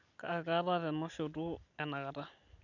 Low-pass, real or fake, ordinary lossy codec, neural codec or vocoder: 7.2 kHz; real; none; none